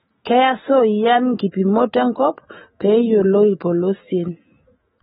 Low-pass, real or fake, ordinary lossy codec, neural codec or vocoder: 19.8 kHz; fake; AAC, 16 kbps; autoencoder, 48 kHz, 128 numbers a frame, DAC-VAE, trained on Japanese speech